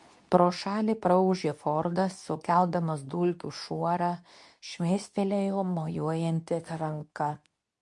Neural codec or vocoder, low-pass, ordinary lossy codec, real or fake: codec, 24 kHz, 0.9 kbps, WavTokenizer, medium speech release version 2; 10.8 kHz; MP3, 64 kbps; fake